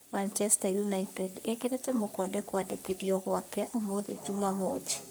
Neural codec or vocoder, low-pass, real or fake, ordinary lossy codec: codec, 44.1 kHz, 3.4 kbps, Pupu-Codec; none; fake; none